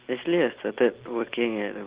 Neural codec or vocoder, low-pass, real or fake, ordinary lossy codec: none; 3.6 kHz; real; Opus, 16 kbps